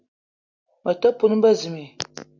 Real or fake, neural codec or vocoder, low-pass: real; none; 7.2 kHz